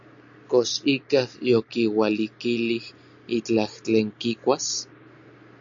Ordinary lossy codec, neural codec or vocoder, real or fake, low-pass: AAC, 64 kbps; none; real; 7.2 kHz